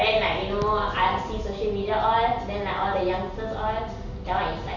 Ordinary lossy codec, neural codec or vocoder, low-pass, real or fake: none; none; 7.2 kHz; real